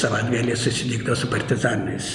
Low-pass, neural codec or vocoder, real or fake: 10.8 kHz; none; real